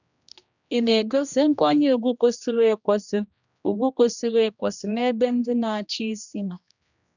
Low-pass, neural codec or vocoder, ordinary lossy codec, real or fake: 7.2 kHz; codec, 16 kHz, 1 kbps, X-Codec, HuBERT features, trained on general audio; none; fake